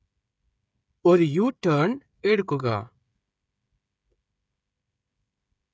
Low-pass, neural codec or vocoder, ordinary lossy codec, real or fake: none; codec, 16 kHz, 16 kbps, FreqCodec, smaller model; none; fake